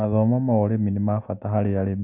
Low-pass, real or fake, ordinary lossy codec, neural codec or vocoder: 3.6 kHz; real; AAC, 32 kbps; none